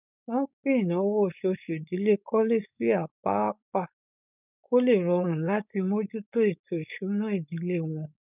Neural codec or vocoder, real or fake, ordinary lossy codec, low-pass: codec, 16 kHz, 4.8 kbps, FACodec; fake; none; 3.6 kHz